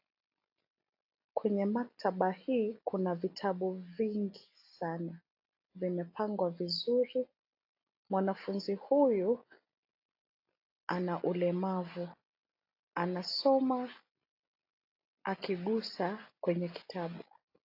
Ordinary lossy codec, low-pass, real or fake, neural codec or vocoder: AAC, 32 kbps; 5.4 kHz; real; none